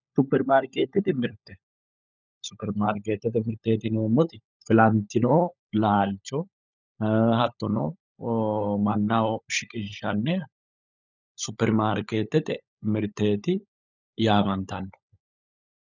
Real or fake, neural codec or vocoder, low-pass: fake; codec, 16 kHz, 16 kbps, FunCodec, trained on LibriTTS, 50 frames a second; 7.2 kHz